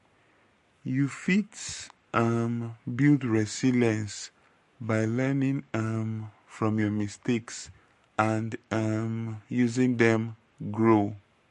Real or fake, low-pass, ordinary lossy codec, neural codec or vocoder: fake; 14.4 kHz; MP3, 48 kbps; codec, 44.1 kHz, 7.8 kbps, Pupu-Codec